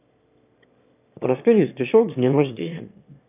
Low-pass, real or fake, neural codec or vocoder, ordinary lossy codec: 3.6 kHz; fake; autoencoder, 22.05 kHz, a latent of 192 numbers a frame, VITS, trained on one speaker; none